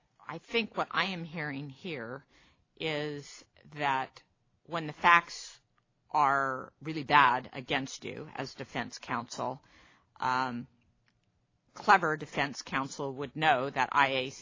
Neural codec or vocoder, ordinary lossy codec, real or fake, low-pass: none; AAC, 32 kbps; real; 7.2 kHz